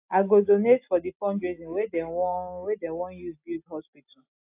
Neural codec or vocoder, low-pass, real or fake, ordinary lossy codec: none; 3.6 kHz; real; AAC, 24 kbps